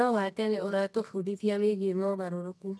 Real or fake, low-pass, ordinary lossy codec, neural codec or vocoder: fake; none; none; codec, 24 kHz, 0.9 kbps, WavTokenizer, medium music audio release